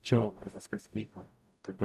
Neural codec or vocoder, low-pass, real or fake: codec, 44.1 kHz, 0.9 kbps, DAC; 14.4 kHz; fake